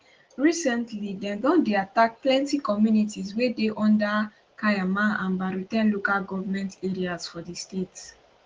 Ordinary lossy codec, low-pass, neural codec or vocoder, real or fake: Opus, 16 kbps; 7.2 kHz; none; real